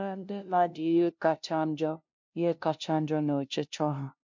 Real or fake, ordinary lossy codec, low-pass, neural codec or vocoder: fake; MP3, 48 kbps; 7.2 kHz; codec, 16 kHz, 0.5 kbps, FunCodec, trained on LibriTTS, 25 frames a second